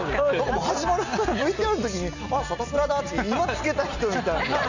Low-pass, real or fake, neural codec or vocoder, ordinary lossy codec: 7.2 kHz; real; none; none